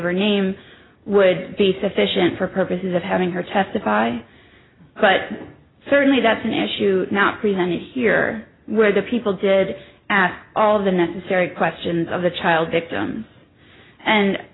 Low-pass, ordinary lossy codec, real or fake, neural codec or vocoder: 7.2 kHz; AAC, 16 kbps; real; none